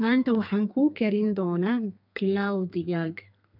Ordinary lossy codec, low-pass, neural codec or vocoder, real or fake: none; 5.4 kHz; codec, 32 kHz, 1.9 kbps, SNAC; fake